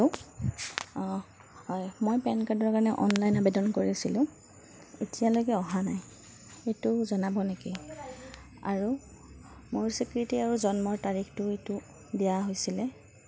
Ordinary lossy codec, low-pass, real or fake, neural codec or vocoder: none; none; real; none